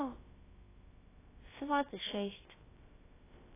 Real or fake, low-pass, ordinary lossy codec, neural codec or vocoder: fake; 3.6 kHz; AAC, 16 kbps; codec, 16 kHz, about 1 kbps, DyCAST, with the encoder's durations